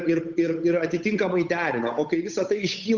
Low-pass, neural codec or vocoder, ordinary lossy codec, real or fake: 7.2 kHz; codec, 16 kHz, 8 kbps, FunCodec, trained on Chinese and English, 25 frames a second; Opus, 64 kbps; fake